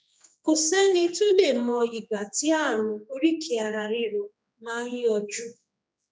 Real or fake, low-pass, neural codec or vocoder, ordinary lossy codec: fake; none; codec, 16 kHz, 2 kbps, X-Codec, HuBERT features, trained on general audio; none